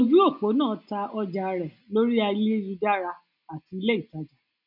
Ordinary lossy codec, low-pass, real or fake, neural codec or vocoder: none; 5.4 kHz; real; none